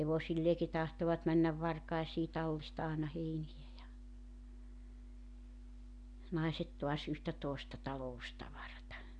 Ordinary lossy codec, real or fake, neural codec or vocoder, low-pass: none; real; none; 9.9 kHz